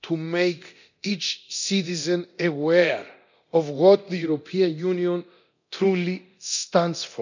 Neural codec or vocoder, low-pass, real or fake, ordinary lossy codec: codec, 24 kHz, 0.9 kbps, DualCodec; 7.2 kHz; fake; none